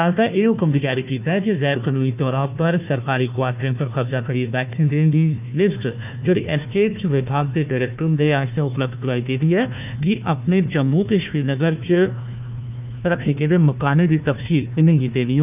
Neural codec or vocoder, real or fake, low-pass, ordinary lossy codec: codec, 16 kHz, 1 kbps, FunCodec, trained on Chinese and English, 50 frames a second; fake; 3.6 kHz; none